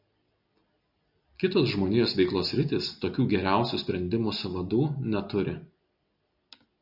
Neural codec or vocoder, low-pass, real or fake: none; 5.4 kHz; real